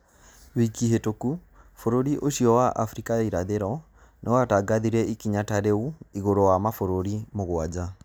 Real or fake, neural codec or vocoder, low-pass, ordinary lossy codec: real; none; none; none